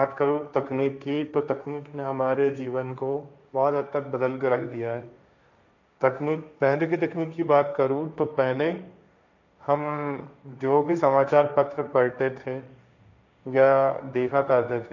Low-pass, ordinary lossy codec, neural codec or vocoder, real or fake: 7.2 kHz; none; codec, 16 kHz, 1.1 kbps, Voila-Tokenizer; fake